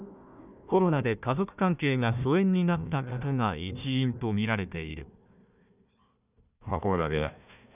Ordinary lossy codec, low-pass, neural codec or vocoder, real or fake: none; 3.6 kHz; codec, 16 kHz, 1 kbps, FunCodec, trained on Chinese and English, 50 frames a second; fake